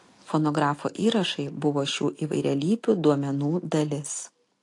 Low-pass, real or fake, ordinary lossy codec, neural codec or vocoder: 10.8 kHz; real; AAC, 48 kbps; none